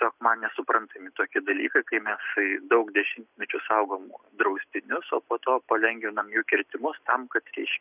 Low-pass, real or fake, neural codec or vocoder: 3.6 kHz; real; none